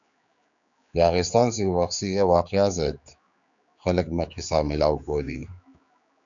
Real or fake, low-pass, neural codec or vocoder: fake; 7.2 kHz; codec, 16 kHz, 4 kbps, X-Codec, HuBERT features, trained on general audio